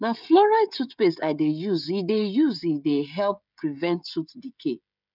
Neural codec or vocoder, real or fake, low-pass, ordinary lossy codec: codec, 16 kHz, 16 kbps, FreqCodec, smaller model; fake; 5.4 kHz; none